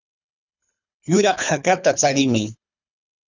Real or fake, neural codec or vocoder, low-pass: fake; codec, 24 kHz, 3 kbps, HILCodec; 7.2 kHz